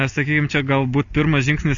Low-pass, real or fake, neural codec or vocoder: 7.2 kHz; real; none